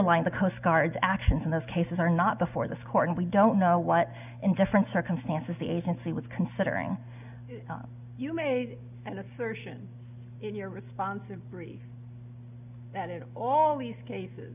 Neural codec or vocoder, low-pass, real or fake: none; 3.6 kHz; real